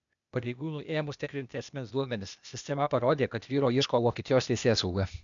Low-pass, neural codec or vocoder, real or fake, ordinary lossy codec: 7.2 kHz; codec, 16 kHz, 0.8 kbps, ZipCodec; fake; MP3, 96 kbps